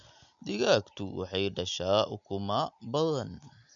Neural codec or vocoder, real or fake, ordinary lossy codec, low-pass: none; real; none; 7.2 kHz